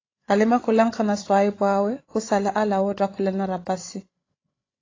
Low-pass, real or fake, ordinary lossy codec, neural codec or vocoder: 7.2 kHz; fake; AAC, 32 kbps; codec, 16 kHz, 16 kbps, FreqCodec, larger model